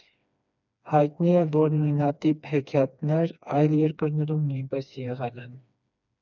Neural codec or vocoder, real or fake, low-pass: codec, 16 kHz, 2 kbps, FreqCodec, smaller model; fake; 7.2 kHz